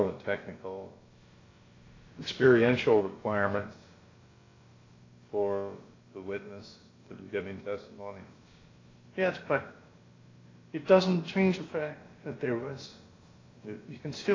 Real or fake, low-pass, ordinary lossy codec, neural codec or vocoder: fake; 7.2 kHz; AAC, 32 kbps; codec, 16 kHz, about 1 kbps, DyCAST, with the encoder's durations